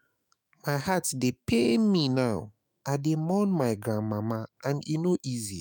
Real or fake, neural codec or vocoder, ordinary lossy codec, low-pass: fake; autoencoder, 48 kHz, 128 numbers a frame, DAC-VAE, trained on Japanese speech; none; none